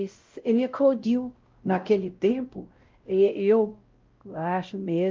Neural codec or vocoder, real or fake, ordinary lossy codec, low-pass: codec, 16 kHz, 0.5 kbps, X-Codec, WavLM features, trained on Multilingual LibriSpeech; fake; Opus, 32 kbps; 7.2 kHz